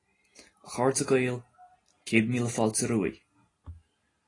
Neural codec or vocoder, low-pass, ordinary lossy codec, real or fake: none; 10.8 kHz; AAC, 32 kbps; real